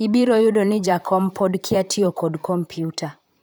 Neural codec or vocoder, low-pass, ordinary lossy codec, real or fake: vocoder, 44.1 kHz, 128 mel bands, Pupu-Vocoder; none; none; fake